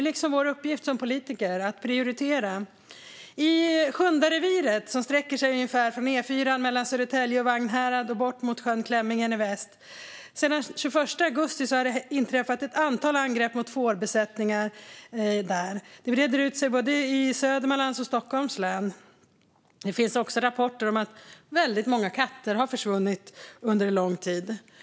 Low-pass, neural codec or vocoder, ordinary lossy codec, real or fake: none; none; none; real